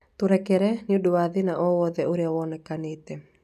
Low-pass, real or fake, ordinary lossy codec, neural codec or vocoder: 14.4 kHz; real; none; none